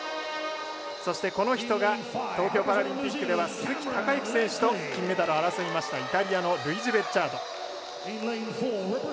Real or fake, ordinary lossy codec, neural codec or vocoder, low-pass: real; none; none; none